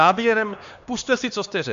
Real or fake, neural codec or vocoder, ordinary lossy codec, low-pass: fake; codec, 16 kHz, 2 kbps, X-Codec, HuBERT features, trained on LibriSpeech; MP3, 64 kbps; 7.2 kHz